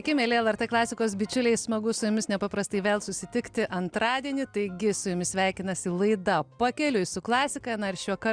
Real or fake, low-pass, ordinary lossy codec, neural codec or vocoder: real; 9.9 kHz; Opus, 64 kbps; none